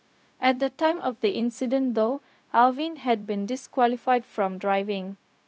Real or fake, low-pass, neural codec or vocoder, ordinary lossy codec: fake; none; codec, 16 kHz, 0.4 kbps, LongCat-Audio-Codec; none